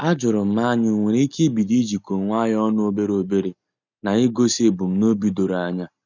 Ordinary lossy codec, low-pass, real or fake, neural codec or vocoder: none; 7.2 kHz; real; none